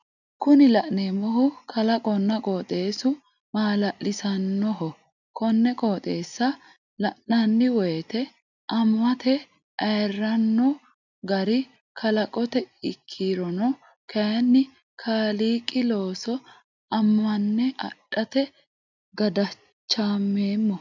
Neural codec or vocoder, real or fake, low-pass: none; real; 7.2 kHz